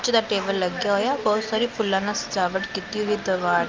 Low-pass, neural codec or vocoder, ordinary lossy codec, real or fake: 7.2 kHz; none; Opus, 24 kbps; real